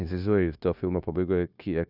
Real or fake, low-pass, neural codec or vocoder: fake; 5.4 kHz; codec, 16 kHz, 0.9 kbps, LongCat-Audio-Codec